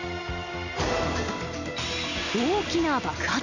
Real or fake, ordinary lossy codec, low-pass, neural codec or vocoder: real; none; 7.2 kHz; none